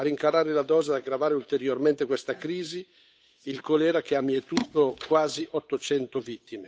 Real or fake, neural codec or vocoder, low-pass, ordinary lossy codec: fake; codec, 16 kHz, 8 kbps, FunCodec, trained on Chinese and English, 25 frames a second; none; none